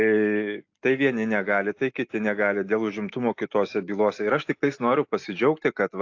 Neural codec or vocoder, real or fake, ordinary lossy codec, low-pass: none; real; AAC, 48 kbps; 7.2 kHz